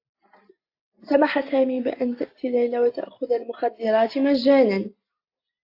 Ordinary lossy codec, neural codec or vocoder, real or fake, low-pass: AAC, 32 kbps; none; real; 5.4 kHz